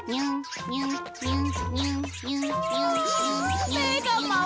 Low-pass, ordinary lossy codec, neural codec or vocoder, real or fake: none; none; none; real